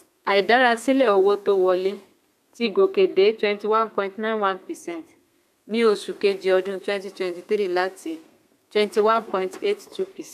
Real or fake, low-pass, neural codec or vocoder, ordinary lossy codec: fake; 14.4 kHz; codec, 32 kHz, 1.9 kbps, SNAC; none